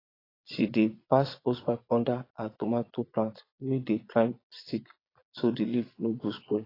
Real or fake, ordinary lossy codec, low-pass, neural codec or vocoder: fake; AAC, 24 kbps; 5.4 kHz; vocoder, 22.05 kHz, 80 mel bands, Vocos